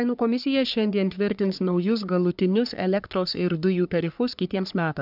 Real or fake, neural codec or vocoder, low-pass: fake; codec, 44.1 kHz, 3.4 kbps, Pupu-Codec; 5.4 kHz